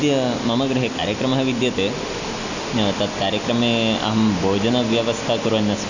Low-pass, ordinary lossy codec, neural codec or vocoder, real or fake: 7.2 kHz; none; none; real